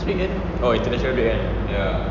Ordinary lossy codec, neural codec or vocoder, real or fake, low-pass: none; none; real; 7.2 kHz